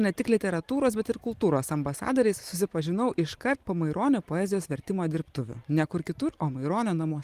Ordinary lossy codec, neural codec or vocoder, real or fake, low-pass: Opus, 24 kbps; none; real; 14.4 kHz